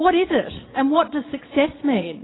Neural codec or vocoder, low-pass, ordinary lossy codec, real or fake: vocoder, 22.05 kHz, 80 mel bands, Vocos; 7.2 kHz; AAC, 16 kbps; fake